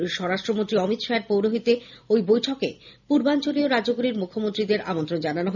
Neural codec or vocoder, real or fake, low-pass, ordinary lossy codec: none; real; 7.2 kHz; none